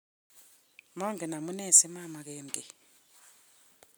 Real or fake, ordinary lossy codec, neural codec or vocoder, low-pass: real; none; none; none